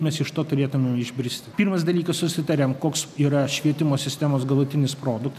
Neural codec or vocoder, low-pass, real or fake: none; 14.4 kHz; real